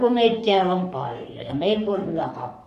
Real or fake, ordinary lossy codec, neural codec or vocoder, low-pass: fake; none; codec, 44.1 kHz, 3.4 kbps, Pupu-Codec; 14.4 kHz